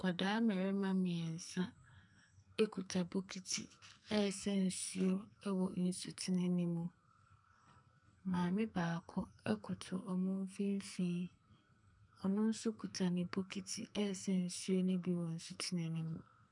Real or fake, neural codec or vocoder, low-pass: fake; codec, 44.1 kHz, 2.6 kbps, SNAC; 10.8 kHz